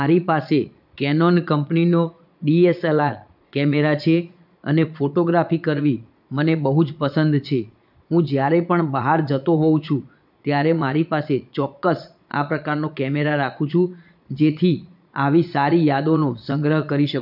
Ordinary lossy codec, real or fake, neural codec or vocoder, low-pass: none; fake; vocoder, 22.05 kHz, 80 mel bands, Vocos; 5.4 kHz